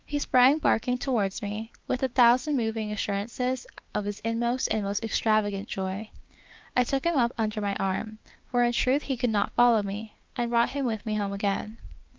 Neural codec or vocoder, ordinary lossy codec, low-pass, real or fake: codec, 16 kHz, 6 kbps, DAC; Opus, 32 kbps; 7.2 kHz; fake